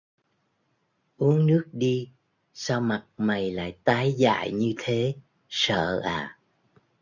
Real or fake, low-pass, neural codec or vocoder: real; 7.2 kHz; none